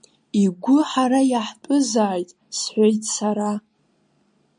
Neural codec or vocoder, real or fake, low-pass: none; real; 9.9 kHz